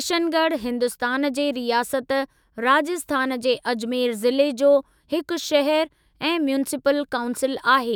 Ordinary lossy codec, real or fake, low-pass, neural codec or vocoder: none; fake; none; autoencoder, 48 kHz, 128 numbers a frame, DAC-VAE, trained on Japanese speech